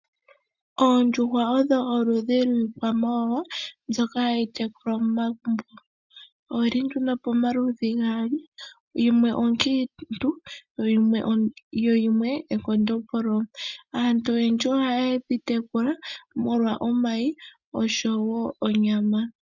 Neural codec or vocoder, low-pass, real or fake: none; 7.2 kHz; real